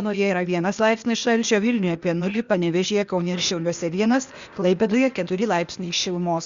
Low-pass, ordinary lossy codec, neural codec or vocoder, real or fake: 7.2 kHz; Opus, 64 kbps; codec, 16 kHz, 0.8 kbps, ZipCodec; fake